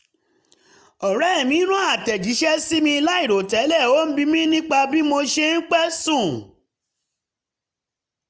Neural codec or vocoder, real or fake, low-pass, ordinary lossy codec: none; real; none; none